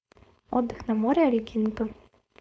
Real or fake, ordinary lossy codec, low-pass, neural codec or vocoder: fake; none; none; codec, 16 kHz, 4.8 kbps, FACodec